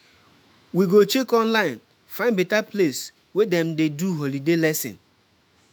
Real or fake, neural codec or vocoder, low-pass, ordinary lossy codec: fake; autoencoder, 48 kHz, 128 numbers a frame, DAC-VAE, trained on Japanese speech; none; none